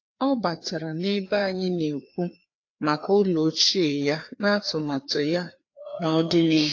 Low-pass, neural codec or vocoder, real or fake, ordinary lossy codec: 7.2 kHz; codec, 16 kHz, 2 kbps, FreqCodec, larger model; fake; AAC, 48 kbps